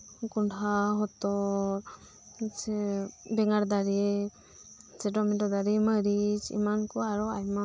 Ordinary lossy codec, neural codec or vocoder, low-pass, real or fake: none; none; none; real